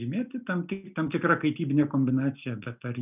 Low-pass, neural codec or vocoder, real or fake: 3.6 kHz; none; real